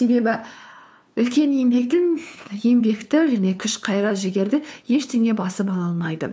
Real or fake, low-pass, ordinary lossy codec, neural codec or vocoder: fake; none; none; codec, 16 kHz, 2 kbps, FunCodec, trained on LibriTTS, 25 frames a second